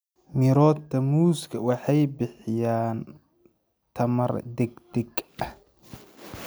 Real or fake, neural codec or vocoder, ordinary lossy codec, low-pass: real; none; none; none